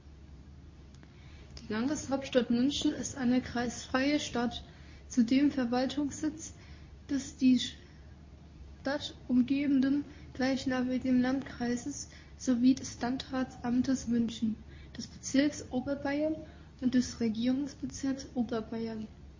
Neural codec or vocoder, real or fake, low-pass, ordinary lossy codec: codec, 24 kHz, 0.9 kbps, WavTokenizer, medium speech release version 2; fake; 7.2 kHz; MP3, 32 kbps